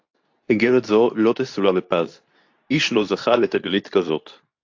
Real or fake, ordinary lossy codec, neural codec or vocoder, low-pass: fake; AAC, 48 kbps; codec, 24 kHz, 0.9 kbps, WavTokenizer, medium speech release version 2; 7.2 kHz